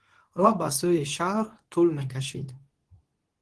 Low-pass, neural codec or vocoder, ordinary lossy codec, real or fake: 10.8 kHz; codec, 24 kHz, 0.9 kbps, WavTokenizer, medium speech release version 2; Opus, 16 kbps; fake